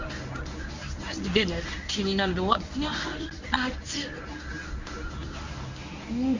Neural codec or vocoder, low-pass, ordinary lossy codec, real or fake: codec, 24 kHz, 0.9 kbps, WavTokenizer, medium speech release version 1; 7.2 kHz; Opus, 64 kbps; fake